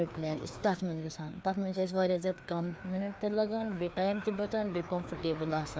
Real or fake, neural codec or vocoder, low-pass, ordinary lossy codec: fake; codec, 16 kHz, 2 kbps, FreqCodec, larger model; none; none